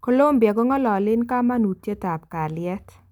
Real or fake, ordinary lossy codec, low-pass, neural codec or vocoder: real; none; 19.8 kHz; none